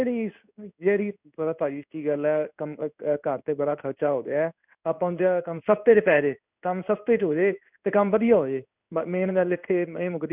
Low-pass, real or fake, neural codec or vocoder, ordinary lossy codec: 3.6 kHz; fake; codec, 16 kHz in and 24 kHz out, 1 kbps, XY-Tokenizer; none